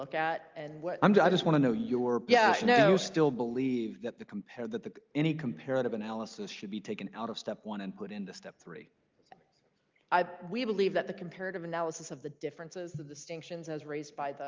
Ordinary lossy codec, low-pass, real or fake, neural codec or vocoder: Opus, 24 kbps; 7.2 kHz; real; none